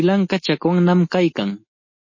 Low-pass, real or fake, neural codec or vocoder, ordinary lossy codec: 7.2 kHz; real; none; MP3, 32 kbps